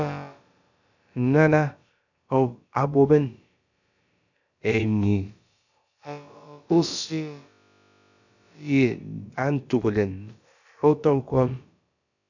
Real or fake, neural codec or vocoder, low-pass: fake; codec, 16 kHz, about 1 kbps, DyCAST, with the encoder's durations; 7.2 kHz